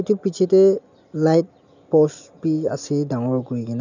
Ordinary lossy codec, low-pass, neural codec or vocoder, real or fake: none; 7.2 kHz; none; real